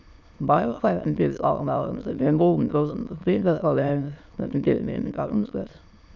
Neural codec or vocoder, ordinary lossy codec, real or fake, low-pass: autoencoder, 22.05 kHz, a latent of 192 numbers a frame, VITS, trained on many speakers; none; fake; 7.2 kHz